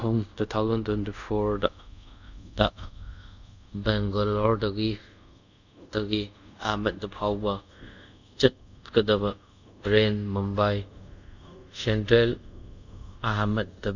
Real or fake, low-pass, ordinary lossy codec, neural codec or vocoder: fake; 7.2 kHz; none; codec, 24 kHz, 0.5 kbps, DualCodec